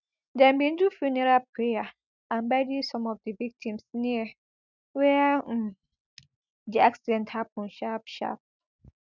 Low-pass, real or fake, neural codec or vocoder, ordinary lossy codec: 7.2 kHz; real; none; none